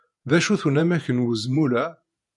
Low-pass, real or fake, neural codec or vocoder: 10.8 kHz; fake; vocoder, 48 kHz, 128 mel bands, Vocos